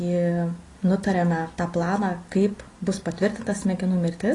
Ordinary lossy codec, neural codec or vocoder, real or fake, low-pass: AAC, 32 kbps; none; real; 10.8 kHz